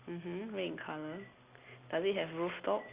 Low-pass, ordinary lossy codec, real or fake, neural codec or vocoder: 3.6 kHz; Opus, 64 kbps; real; none